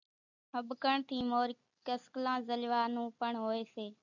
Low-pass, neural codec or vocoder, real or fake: 7.2 kHz; none; real